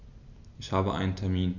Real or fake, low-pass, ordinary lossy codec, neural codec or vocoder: real; 7.2 kHz; none; none